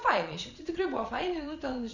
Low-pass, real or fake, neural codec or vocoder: 7.2 kHz; fake; vocoder, 22.05 kHz, 80 mel bands, Vocos